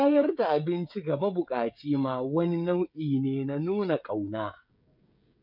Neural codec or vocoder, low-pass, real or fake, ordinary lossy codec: codec, 16 kHz, 16 kbps, FreqCodec, smaller model; 5.4 kHz; fake; none